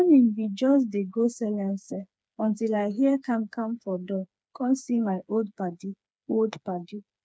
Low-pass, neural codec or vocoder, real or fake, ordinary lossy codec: none; codec, 16 kHz, 4 kbps, FreqCodec, smaller model; fake; none